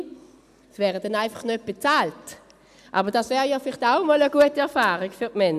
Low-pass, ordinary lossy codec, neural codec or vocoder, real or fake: 14.4 kHz; none; none; real